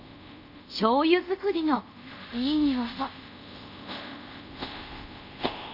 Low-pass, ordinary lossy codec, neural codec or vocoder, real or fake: 5.4 kHz; none; codec, 24 kHz, 0.5 kbps, DualCodec; fake